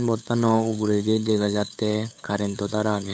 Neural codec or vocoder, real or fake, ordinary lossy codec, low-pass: codec, 16 kHz, 8 kbps, FunCodec, trained on LibriTTS, 25 frames a second; fake; none; none